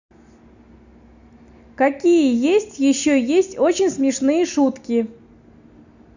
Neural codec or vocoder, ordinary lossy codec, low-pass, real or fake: none; none; 7.2 kHz; real